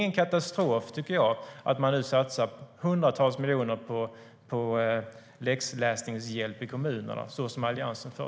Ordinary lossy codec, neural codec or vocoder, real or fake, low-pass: none; none; real; none